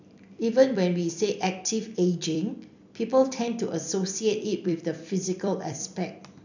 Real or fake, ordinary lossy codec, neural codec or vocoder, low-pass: fake; none; vocoder, 44.1 kHz, 128 mel bands every 256 samples, BigVGAN v2; 7.2 kHz